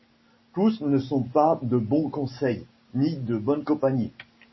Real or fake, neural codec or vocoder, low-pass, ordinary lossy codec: real; none; 7.2 kHz; MP3, 24 kbps